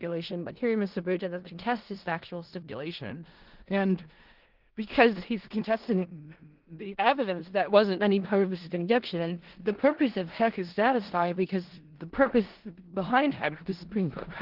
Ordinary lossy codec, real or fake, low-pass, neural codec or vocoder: Opus, 16 kbps; fake; 5.4 kHz; codec, 16 kHz in and 24 kHz out, 0.4 kbps, LongCat-Audio-Codec, four codebook decoder